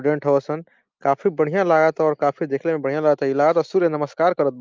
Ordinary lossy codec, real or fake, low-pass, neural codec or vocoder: Opus, 24 kbps; real; 7.2 kHz; none